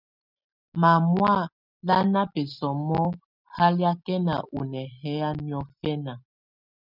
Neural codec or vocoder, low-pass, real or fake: none; 5.4 kHz; real